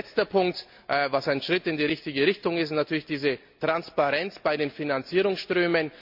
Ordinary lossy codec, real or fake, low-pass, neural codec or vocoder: MP3, 48 kbps; real; 5.4 kHz; none